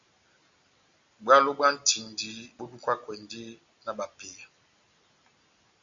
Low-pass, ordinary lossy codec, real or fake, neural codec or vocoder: 7.2 kHz; Opus, 64 kbps; real; none